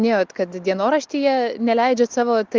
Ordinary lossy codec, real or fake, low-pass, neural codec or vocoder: Opus, 32 kbps; real; 7.2 kHz; none